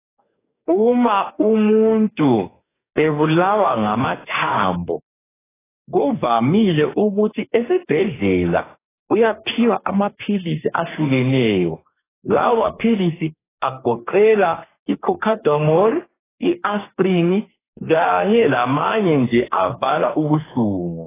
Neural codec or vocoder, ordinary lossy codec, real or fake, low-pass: codec, 44.1 kHz, 2.6 kbps, DAC; AAC, 16 kbps; fake; 3.6 kHz